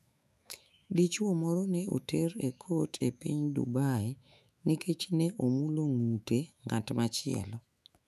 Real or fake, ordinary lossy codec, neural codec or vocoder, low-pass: fake; none; autoencoder, 48 kHz, 128 numbers a frame, DAC-VAE, trained on Japanese speech; 14.4 kHz